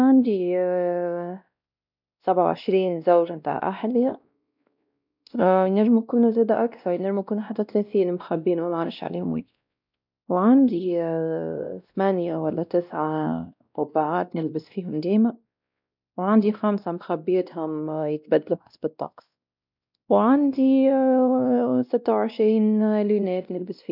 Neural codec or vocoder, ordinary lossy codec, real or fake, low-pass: codec, 16 kHz, 1 kbps, X-Codec, WavLM features, trained on Multilingual LibriSpeech; none; fake; 5.4 kHz